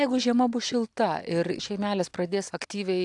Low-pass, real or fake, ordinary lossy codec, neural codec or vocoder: 10.8 kHz; real; AAC, 64 kbps; none